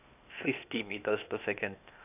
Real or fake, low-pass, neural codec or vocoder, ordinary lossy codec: fake; 3.6 kHz; codec, 16 kHz, 0.8 kbps, ZipCodec; none